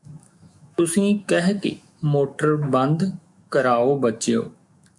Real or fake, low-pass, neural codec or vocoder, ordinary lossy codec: fake; 10.8 kHz; autoencoder, 48 kHz, 128 numbers a frame, DAC-VAE, trained on Japanese speech; MP3, 64 kbps